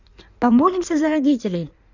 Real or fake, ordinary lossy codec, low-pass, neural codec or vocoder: fake; none; 7.2 kHz; codec, 16 kHz in and 24 kHz out, 1.1 kbps, FireRedTTS-2 codec